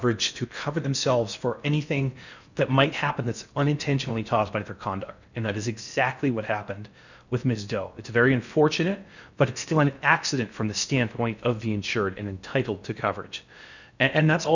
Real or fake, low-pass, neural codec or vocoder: fake; 7.2 kHz; codec, 16 kHz in and 24 kHz out, 0.6 kbps, FocalCodec, streaming, 4096 codes